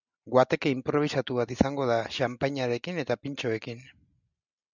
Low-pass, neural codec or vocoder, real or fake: 7.2 kHz; none; real